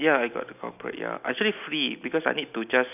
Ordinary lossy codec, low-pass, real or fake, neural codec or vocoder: none; 3.6 kHz; real; none